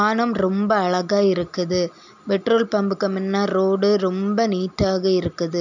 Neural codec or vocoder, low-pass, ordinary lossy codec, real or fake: none; 7.2 kHz; none; real